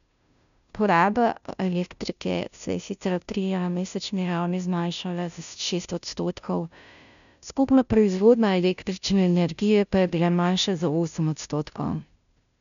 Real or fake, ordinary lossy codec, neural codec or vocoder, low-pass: fake; none; codec, 16 kHz, 0.5 kbps, FunCodec, trained on Chinese and English, 25 frames a second; 7.2 kHz